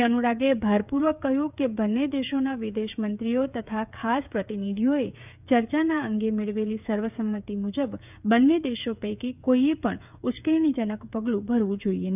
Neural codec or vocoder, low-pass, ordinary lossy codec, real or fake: codec, 16 kHz, 8 kbps, FreqCodec, smaller model; 3.6 kHz; none; fake